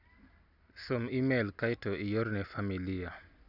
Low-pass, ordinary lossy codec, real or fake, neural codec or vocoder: 5.4 kHz; none; real; none